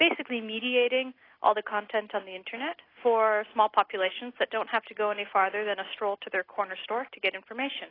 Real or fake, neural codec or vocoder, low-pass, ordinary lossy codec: real; none; 5.4 kHz; AAC, 24 kbps